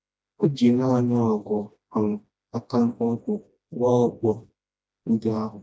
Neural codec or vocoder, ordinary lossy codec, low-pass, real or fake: codec, 16 kHz, 1 kbps, FreqCodec, smaller model; none; none; fake